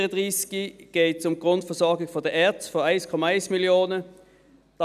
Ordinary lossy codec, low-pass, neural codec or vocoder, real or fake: none; 14.4 kHz; none; real